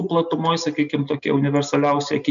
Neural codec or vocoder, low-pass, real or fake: none; 7.2 kHz; real